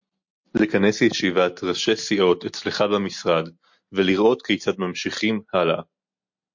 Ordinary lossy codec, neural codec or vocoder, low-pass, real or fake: MP3, 48 kbps; none; 7.2 kHz; real